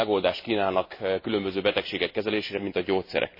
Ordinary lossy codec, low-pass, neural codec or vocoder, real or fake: MP3, 24 kbps; 5.4 kHz; none; real